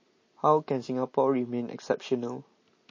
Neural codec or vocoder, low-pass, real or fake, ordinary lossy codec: none; 7.2 kHz; real; MP3, 32 kbps